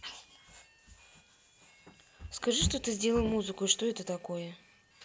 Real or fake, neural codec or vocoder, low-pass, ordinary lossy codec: real; none; none; none